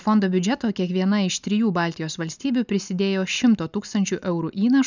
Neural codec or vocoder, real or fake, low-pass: none; real; 7.2 kHz